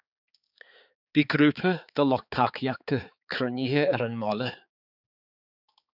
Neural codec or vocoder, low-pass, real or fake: codec, 16 kHz, 4 kbps, X-Codec, HuBERT features, trained on balanced general audio; 5.4 kHz; fake